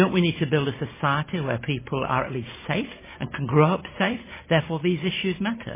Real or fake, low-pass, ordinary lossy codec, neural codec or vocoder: real; 3.6 kHz; MP3, 16 kbps; none